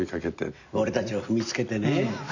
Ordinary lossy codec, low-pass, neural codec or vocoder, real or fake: none; 7.2 kHz; none; real